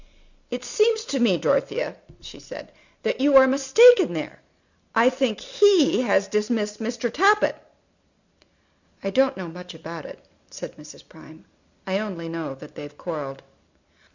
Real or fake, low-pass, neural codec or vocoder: real; 7.2 kHz; none